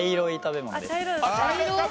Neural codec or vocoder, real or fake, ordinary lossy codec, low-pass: none; real; none; none